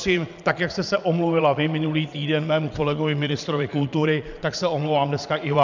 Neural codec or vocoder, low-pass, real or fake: vocoder, 22.05 kHz, 80 mel bands, Vocos; 7.2 kHz; fake